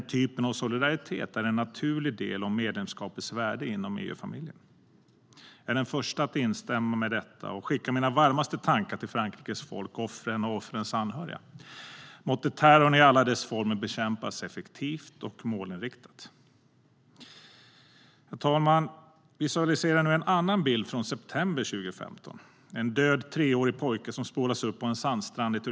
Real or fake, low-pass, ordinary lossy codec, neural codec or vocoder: real; none; none; none